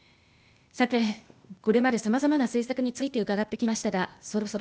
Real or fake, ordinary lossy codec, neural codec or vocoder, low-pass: fake; none; codec, 16 kHz, 0.8 kbps, ZipCodec; none